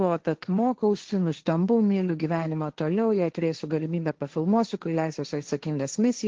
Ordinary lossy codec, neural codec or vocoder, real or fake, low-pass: Opus, 16 kbps; codec, 16 kHz, 1.1 kbps, Voila-Tokenizer; fake; 7.2 kHz